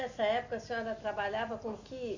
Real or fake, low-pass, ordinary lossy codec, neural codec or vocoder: real; 7.2 kHz; none; none